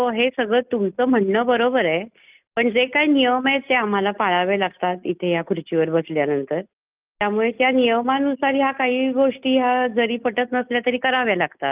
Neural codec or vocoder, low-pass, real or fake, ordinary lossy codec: none; 3.6 kHz; real; Opus, 24 kbps